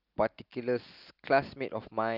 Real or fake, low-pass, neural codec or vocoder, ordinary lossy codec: real; 5.4 kHz; none; Opus, 24 kbps